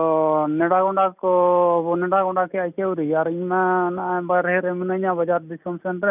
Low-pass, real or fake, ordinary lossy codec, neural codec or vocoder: 3.6 kHz; real; none; none